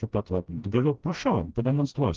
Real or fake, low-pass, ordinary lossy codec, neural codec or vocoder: fake; 7.2 kHz; Opus, 16 kbps; codec, 16 kHz, 1 kbps, FreqCodec, smaller model